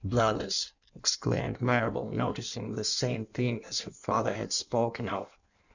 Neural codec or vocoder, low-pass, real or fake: codec, 16 kHz in and 24 kHz out, 1.1 kbps, FireRedTTS-2 codec; 7.2 kHz; fake